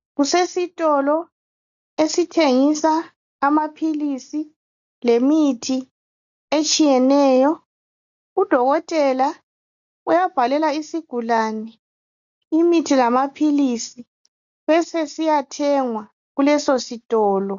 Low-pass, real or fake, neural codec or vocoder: 7.2 kHz; real; none